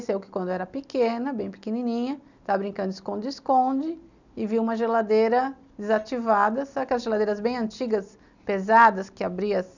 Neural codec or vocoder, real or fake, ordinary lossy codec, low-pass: none; real; none; 7.2 kHz